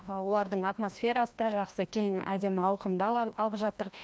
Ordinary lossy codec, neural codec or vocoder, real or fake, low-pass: none; codec, 16 kHz, 1 kbps, FreqCodec, larger model; fake; none